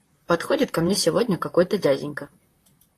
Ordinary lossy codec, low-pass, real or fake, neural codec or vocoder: AAC, 48 kbps; 14.4 kHz; fake; vocoder, 44.1 kHz, 128 mel bands, Pupu-Vocoder